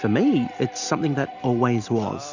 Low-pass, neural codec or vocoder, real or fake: 7.2 kHz; none; real